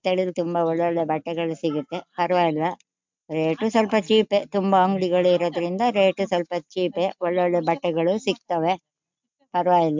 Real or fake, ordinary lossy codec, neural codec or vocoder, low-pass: real; MP3, 64 kbps; none; 7.2 kHz